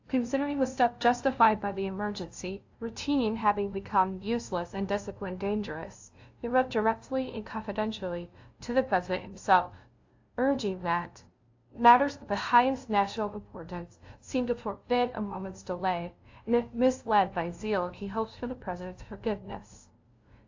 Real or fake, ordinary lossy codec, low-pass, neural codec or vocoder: fake; AAC, 48 kbps; 7.2 kHz; codec, 16 kHz, 0.5 kbps, FunCodec, trained on LibriTTS, 25 frames a second